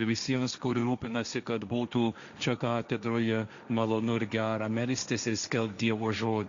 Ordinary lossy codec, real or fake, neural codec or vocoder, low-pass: Opus, 64 kbps; fake; codec, 16 kHz, 1.1 kbps, Voila-Tokenizer; 7.2 kHz